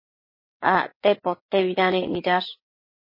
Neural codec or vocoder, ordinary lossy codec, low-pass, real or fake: vocoder, 22.05 kHz, 80 mel bands, WaveNeXt; MP3, 24 kbps; 5.4 kHz; fake